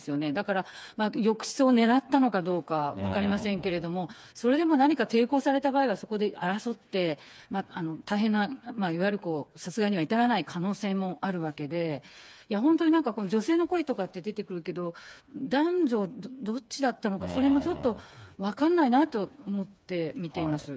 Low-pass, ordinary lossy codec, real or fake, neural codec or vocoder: none; none; fake; codec, 16 kHz, 4 kbps, FreqCodec, smaller model